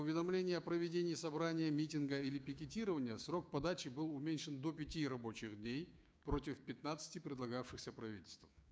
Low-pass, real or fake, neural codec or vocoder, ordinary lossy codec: none; fake; codec, 16 kHz, 6 kbps, DAC; none